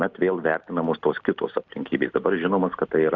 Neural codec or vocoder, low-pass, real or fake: none; 7.2 kHz; real